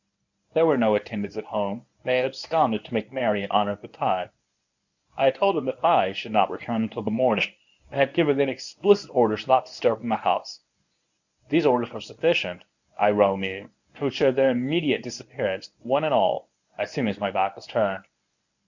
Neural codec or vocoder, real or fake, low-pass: codec, 24 kHz, 0.9 kbps, WavTokenizer, medium speech release version 1; fake; 7.2 kHz